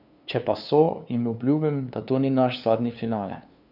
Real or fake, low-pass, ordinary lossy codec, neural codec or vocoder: fake; 5.4 kHz; none; codec, 16 kHz, 2 kbps, FunCodec, trained on LibriTTS, 25 frames a second